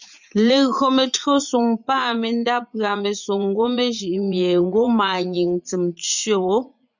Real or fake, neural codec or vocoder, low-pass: fake; vocoder, 44.1 kHz, 80 mel bands, Vocos; 7.2 kHz